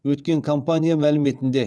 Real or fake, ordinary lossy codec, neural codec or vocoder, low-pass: fake; none; vocoder, 22.05 kHz, 80 mel bands, WaveNeXt; none